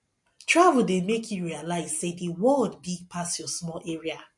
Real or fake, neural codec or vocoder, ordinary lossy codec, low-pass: real; none; MP3, 48 kbps; 10.8 kHz